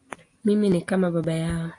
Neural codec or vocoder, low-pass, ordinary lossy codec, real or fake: none; 10.8 kHz; AAC, 48 kbps; real